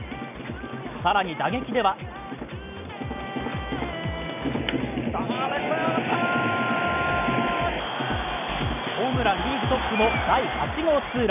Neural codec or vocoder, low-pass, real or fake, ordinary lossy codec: none; 3.6 kHz; real; none